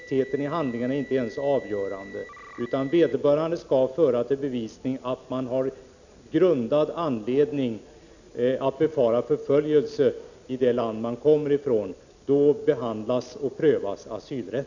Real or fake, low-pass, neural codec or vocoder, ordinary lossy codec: real; 7.2 kHz; none; none